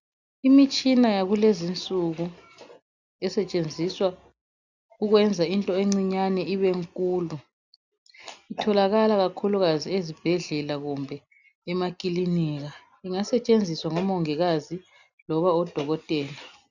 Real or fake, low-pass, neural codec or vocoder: real; 7.2 kHz; none